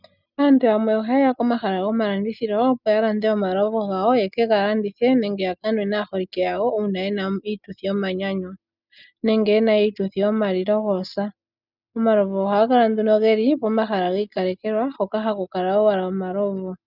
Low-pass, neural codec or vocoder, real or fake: 5.4 kHz; none; real